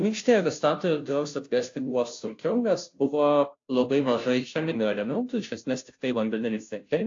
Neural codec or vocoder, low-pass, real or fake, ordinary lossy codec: codec, 16 kHz, 0.5 kbps, FunCodec, trained on Chinese and English, 25 frames a second; 7.2 kHz; fake; AAC, 48 kbps